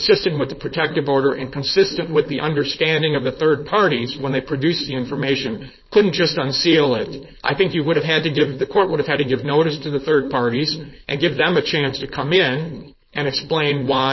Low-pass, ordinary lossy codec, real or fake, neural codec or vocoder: 7.2 kHz; MP3, 24 kbps; fake; codec, 16 kHz, 4.8 kbps, FACodec